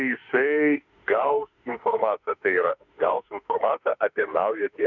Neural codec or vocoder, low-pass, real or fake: autoencoder, 48 kHz, 32 numbers a frame, DAC-VAE, trained on Japanese speech; 7.2 kHz; fake